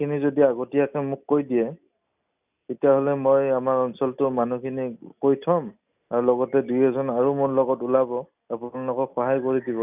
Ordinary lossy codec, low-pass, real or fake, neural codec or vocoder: none; 3.6 kHz; real; none